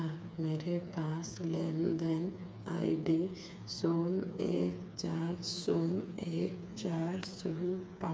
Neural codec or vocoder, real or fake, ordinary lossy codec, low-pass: codec, 16 kHz, 4 kbps, FreqCodec, smaller model; fake; none; none